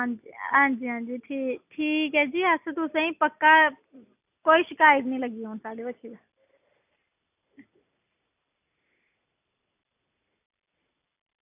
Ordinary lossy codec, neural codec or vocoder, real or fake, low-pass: none; none; real; 3.6 kHz